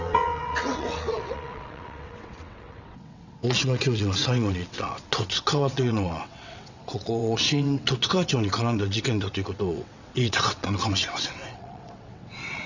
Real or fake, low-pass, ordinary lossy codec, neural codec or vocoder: fake; 7.2 kHz; none; vocoder, 22.05 kHz, 80 mel bands, WaveNeXt